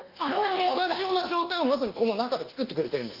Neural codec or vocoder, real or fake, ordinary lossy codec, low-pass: codec, 24 kHz, 1.2 kbps, DualCodec; fake; Opus, 32 kbps; 5.4 kHz